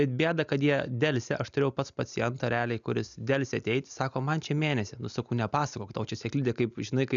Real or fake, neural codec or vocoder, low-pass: real; none; 7.2 kHz